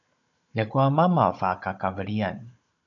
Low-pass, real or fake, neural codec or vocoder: 7.2 kHz; fake; codec, 16 kHz, 16 kbps, FunCodec, trained on Chinese and English, 50 frames a second